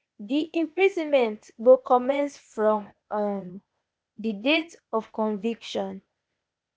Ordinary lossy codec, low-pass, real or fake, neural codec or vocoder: none; none; fake; codec, 16 kHz, 0.8 kbps, ZipCodec